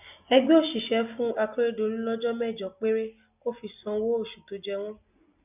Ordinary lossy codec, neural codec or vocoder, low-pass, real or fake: none; none; 3.6 kHz; real